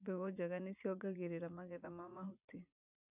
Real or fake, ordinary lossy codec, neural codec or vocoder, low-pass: fake; none; vocoder, 44.1 kHz, 80 mel bands, Vocos; 3.6 kHz